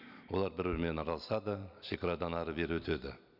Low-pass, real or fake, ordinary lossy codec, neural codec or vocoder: 5.4 kHz; real; none; none